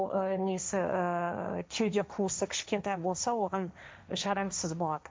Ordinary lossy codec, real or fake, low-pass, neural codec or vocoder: none; fake; none; codec, 16 kHz, 1.1 kbps, Voila-Tokenizer